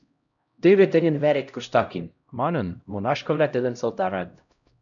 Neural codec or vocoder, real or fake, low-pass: codec, 16 kHz, 0.5 kbps, X-Codec, HuBERT features, trained on LibriSpeech; fake; 7.2 kHz